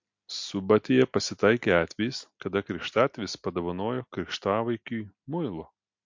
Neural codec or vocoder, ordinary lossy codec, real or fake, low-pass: none; MP3, 48 kbps; real; 7.2 kHz